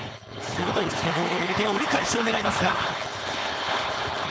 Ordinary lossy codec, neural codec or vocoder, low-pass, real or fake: none; codec, 16 kHz, 4.8 kbps, FACodec; none; fake